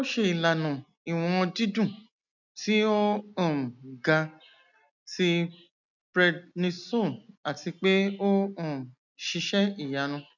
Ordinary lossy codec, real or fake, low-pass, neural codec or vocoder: none; real; 7.2 kHz; none